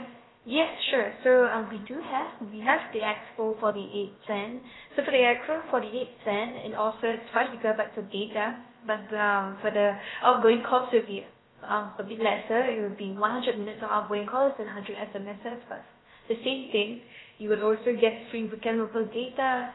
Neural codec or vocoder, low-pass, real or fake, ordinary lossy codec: codec, 16 kHz, about 1 kbps, DyCAST, with the encoder's durations; 7.2 kHz; fake; AAC, 16 kbps